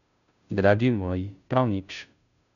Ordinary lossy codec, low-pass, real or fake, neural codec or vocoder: none; 7.2 kHz; fake; codec, 16 kHz, 0.5 kbps, FunCodec, trained on Chinese and English, 25 frames a second